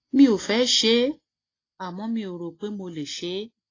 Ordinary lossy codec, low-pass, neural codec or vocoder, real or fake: AAC, 32 kbps; 7.2 kHz; none; real